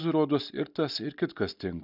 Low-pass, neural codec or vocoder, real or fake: 5.4 kHz; none; real